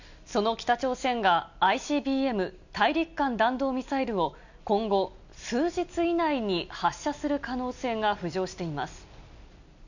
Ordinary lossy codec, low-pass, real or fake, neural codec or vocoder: none; 7.2 kHz; real; none